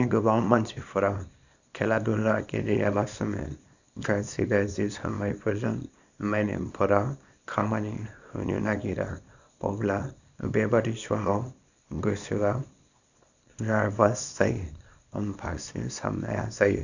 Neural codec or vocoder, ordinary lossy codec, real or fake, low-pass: codec, 24 kHz, 0.9 kbps, WavTokenizer, small release; none; fake; 7.2 kHz